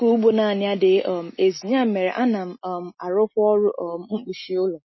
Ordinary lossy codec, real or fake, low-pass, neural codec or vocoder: MP3, 24 kbps; real; 7.2 kHz; none